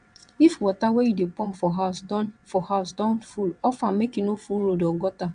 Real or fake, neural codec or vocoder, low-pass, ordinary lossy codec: fake; vocoder, 22.05 kHz, 80 mel bands, WaveNeXt; 9.9 kHz; AAC, 96 kbps